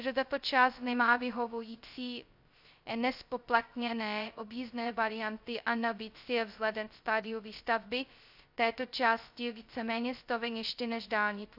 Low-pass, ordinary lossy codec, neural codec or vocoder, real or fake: 5.4 kHz; AAC, 48 kbps; codec, 16 kHz, 0.2 kbps, FocalCodec; fake